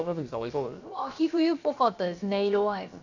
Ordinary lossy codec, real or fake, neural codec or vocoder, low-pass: none; fake; codec, 16 kHz, about 1 kbps, DyCAST, with the encoder's durations; 7.2 kHz